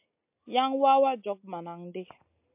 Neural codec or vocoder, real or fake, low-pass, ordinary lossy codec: none; real; 3.6 kHz; MP3, 32 kbps